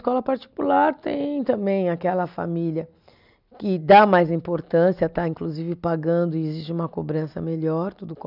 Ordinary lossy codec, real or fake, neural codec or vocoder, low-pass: none; real; none; 5.4 kHz